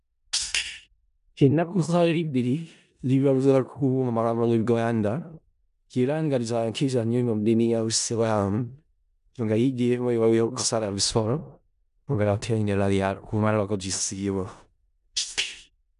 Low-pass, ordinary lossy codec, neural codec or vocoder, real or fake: 10.8 kHz; none; codec, 16 kHz in and 24 kHz out, 0.4 kbps, LongCat-Audio-Codec, four codebook decoder; fake